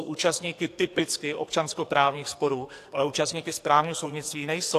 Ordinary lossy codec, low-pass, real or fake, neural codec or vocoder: AAC, 64 kbps; 14.4 kHz; fake; codec, 44.1 kHz, 2.6 kbps, SNAC